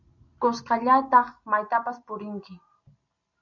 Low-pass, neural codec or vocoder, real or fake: 7.2 kHz; none; real